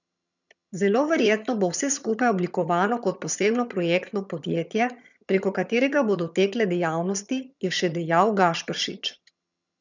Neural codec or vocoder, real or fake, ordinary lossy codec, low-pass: vocoder, 22.05 kHz, 80 mel bands, HiFi-GAN; fake; none; 7.2 kHz